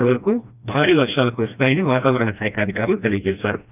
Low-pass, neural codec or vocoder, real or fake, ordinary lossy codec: 3.6 kHz; codec, 16 kHz, 1 kbps, FreqCodec, smaller model; fake; none